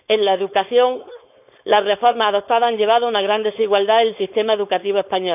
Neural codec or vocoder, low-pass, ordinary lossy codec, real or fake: codec, 16 kHz, 4.8 kbps, FACodec; 3.6 kHz; none; fake